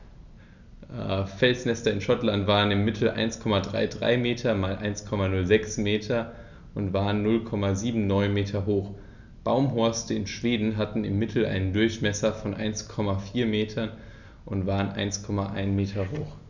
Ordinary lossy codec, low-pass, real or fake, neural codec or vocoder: none; 7.2 kHz; real; none